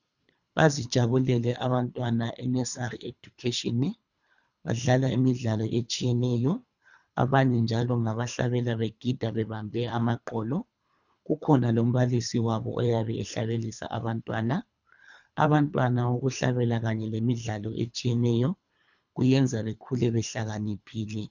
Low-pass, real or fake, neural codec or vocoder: 7.2 kHz; fake; codec, 24 kHz, 3 kbps, HILCodec